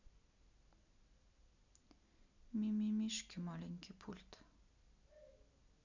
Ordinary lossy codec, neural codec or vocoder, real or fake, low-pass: none; none; real; 7.2 kHz